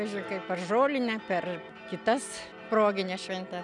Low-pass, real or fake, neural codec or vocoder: 10.8 kHz; real; none